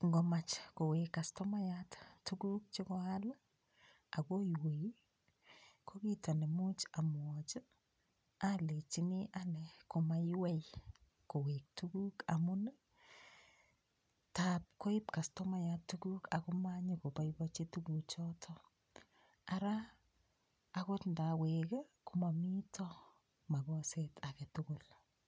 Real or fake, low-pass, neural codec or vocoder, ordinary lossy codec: real; none; none; none